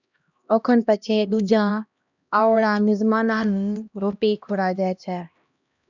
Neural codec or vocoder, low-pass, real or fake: codec, 16 kHz, 1 kbps, X-Codec, HuBERT features, trained on LibriSpeech; 7.2 kHz; fake